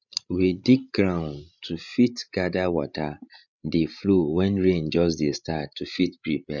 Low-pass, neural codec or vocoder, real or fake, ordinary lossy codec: 7.2 kHz; codec, 16 kHz, 16 kbps, FreqCodec, larger model; fake; none